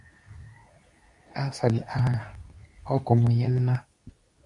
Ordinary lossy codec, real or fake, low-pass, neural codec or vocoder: AAC, 64 kbps; fake; 10.8 kHz; codec, 24 kHz, 0.9 kbps, WavTokenizer, medium speech release version 2